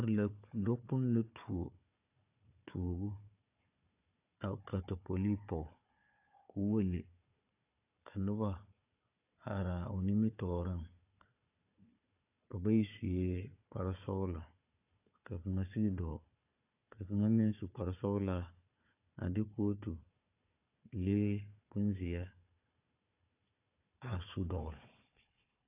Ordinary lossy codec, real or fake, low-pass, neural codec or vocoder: AAC, 32 kbps; fake; 3.6 kHz; codec, 16 kHz, 4 kbps, FunCodec, trained on Chinese and English, 50 frames a second